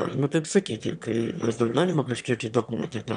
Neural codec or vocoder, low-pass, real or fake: autoencoder, 22.05 kHz, a latent of 192 numbers a frame, VITS, trained on one speaker; 9.9 kHz; fake